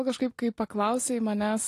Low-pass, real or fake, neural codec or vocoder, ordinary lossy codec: 14.4 kHz; real; none; AAC, 48 kbps